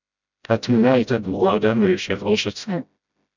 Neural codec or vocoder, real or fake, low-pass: codec, 16 kHz, 0.5 kbps, FreqCodec, smaller model; fake; 7.2 kHz